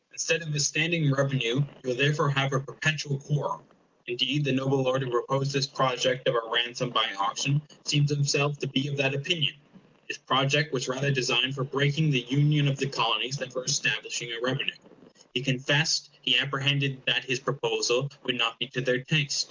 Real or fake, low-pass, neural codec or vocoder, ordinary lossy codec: real; 7.2 kHz; none; Opus, 32 kbps